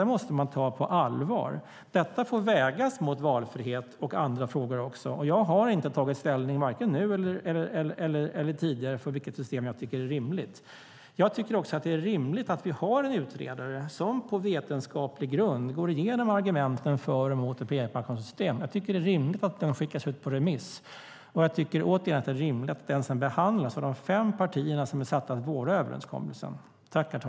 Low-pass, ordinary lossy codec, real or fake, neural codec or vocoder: none; none; real; none